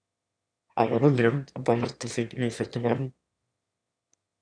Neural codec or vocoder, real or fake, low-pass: autoencoder, 22.05 kHz, a latent of 192 numbers a frame, VITS, trained on one speaker; fake; 9.9 kHz